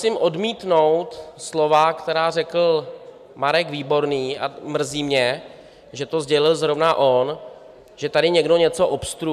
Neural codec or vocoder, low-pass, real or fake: none; 14.4 kHz; real